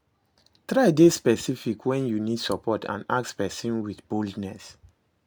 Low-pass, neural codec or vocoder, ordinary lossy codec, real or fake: none; none; none; real